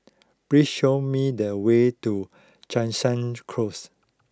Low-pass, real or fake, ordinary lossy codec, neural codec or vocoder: none; real; none; none